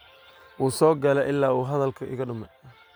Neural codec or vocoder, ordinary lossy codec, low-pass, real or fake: none; none; none; real